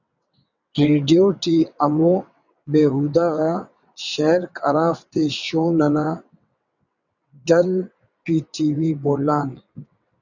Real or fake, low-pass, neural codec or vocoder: fake; 7.2 kHz; vocoder, 22.05 kHz, 80 mel bands, WaveNeXt